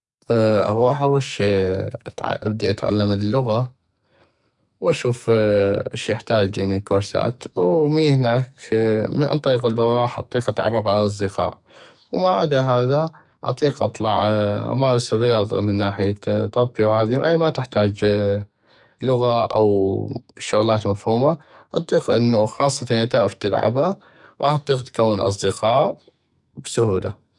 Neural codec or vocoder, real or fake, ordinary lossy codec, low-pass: codec, 44.1 kHz, 2.6 kbps, SNAC; fake; none; 10.8 kHz